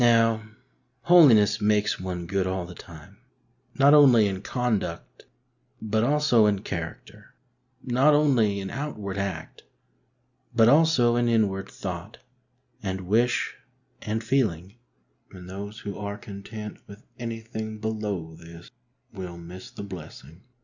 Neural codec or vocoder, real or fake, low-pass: none; real; 7.2 kHz